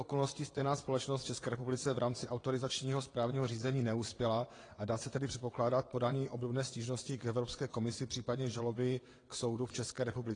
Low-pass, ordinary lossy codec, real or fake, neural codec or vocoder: 9.9 kHz; AAC, 32 kbps; fake; vocoder, 22.05 kHz, 80 mel bands, WaveNeXt